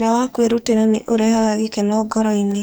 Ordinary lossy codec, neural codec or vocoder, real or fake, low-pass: none; codec, 44.1 kHz, 2.6 kbps, SNAC; fake; none